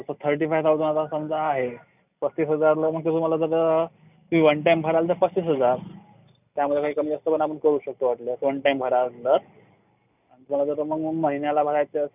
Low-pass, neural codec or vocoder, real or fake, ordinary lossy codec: 3.6 kHz; none; real; none